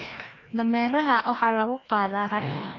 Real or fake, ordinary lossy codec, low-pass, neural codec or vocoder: fake; AAC, 32 kbps; 7.2 kHz; codec, 16 kHz, 1 kbps, FreqCodec, larger model